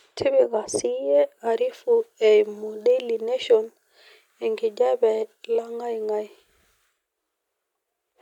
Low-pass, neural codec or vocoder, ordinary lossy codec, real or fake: 19.8 kHz; none; none; real